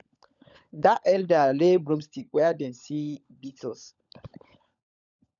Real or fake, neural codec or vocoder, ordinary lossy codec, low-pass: fake; codec, 16 kHz, 16 kbps, FunCodec, trained on LibriTTS, 50 frames a second; none; 7.2 kHz